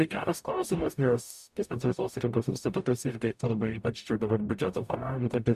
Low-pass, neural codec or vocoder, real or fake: 14.4 kHz; codec, 44.1 kHz, 0.9 kbps, DAC; fake